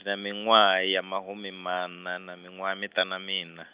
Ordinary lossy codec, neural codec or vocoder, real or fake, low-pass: Opus, 64 kbps; none; real; 3.6 kHz